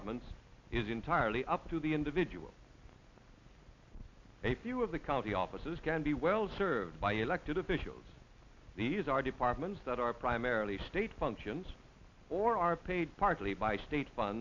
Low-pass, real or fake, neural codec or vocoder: 7.2 kHz; real; none